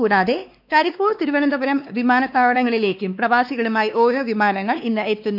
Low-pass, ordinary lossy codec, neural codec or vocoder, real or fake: 5.4 kHz; none; codec, 16 kHz, 2 kbps, X-Codec, WavLM features, trained on Multilingual LibriSpeech; fake